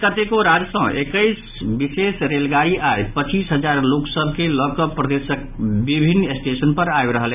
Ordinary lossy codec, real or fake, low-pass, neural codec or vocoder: none; real; 3.6 kHz; none